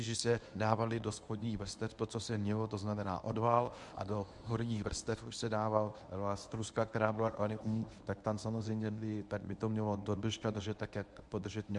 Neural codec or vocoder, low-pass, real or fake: codec, 24 kHz, 0.9 kbps, WavTokenizer, medium speech release version 1; 10.8 kHz; fake